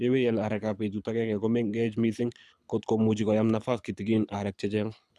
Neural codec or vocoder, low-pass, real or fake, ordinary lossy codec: codec, 24 kHz, 6 kbps, HILCodec; none; fake; none